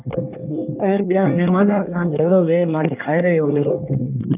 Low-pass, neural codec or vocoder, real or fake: 3.6 kHz; codec, 24 kHz, 1 kbps, SNAC; fake